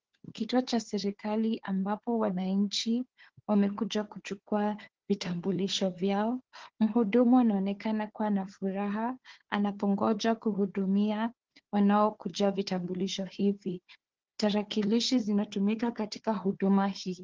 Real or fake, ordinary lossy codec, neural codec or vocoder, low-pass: fake; Opus, 16 kbps; codec, 16 kHz, 4 kbps, FunCodec, trained on Chinese and English, 50 frames a second; 7.2 kHz